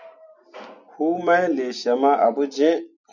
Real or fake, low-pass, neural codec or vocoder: real; 7.2 kHz; none